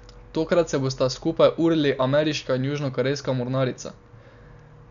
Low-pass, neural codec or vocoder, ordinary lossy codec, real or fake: 7.2 kHz; none; none; real